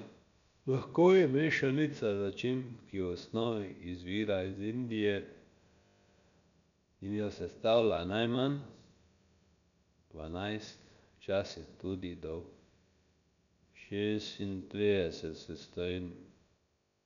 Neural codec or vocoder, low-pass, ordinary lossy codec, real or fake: codec, 16 kHz, about 1 kbps, DyCAST, with the encoder's durations; 7.2 kHz; none; fake